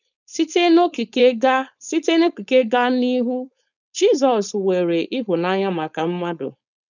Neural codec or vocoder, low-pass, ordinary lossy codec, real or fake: codec, 16 kHz, 4.8 kbps, FACodec; 7.2 kHz; none; fake